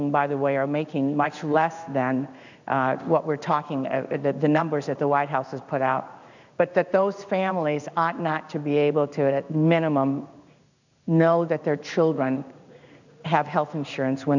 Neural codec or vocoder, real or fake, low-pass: codec, 16 kHz in and 24 kHz out, 1 kbps, XY-Tokenizer; fake; 7.2 kHz